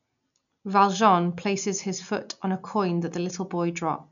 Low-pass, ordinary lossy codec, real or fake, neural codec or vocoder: 7.2 kHz; none; real; none